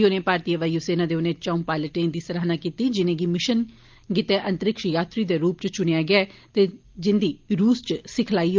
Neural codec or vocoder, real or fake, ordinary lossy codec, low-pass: none; real; Opus, 24 kbps; 7.2 kHz